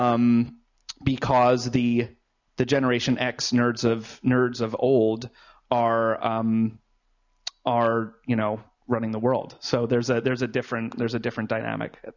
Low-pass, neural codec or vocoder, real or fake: 7.2 kHz; none; real